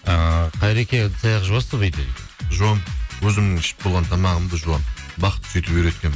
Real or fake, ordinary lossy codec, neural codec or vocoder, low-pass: real; none; none; none